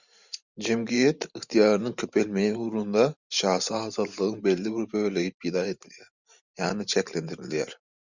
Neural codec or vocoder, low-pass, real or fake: vocoder, 44.1 kHz, 128 mel bands every 512 samples, BigVGAN v2; 7.2 kHz; fake